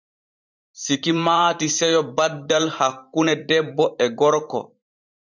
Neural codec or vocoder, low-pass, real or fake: vocoder, 44.1 kHz, 128 mel bands every 512 samples, BigVGAN v2; 7.2 kHz; fake